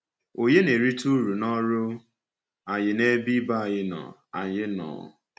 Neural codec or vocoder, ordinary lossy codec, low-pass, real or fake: none; none; none; real